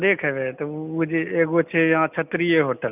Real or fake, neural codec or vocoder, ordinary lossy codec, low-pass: real; none; none; 3.6 kHz